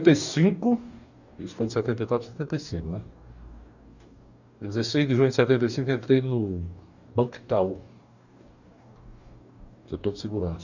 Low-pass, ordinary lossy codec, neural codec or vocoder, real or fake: 7.2 kHz; none; codec, 44.1 kHz, 2.6 kbps, DAC; fake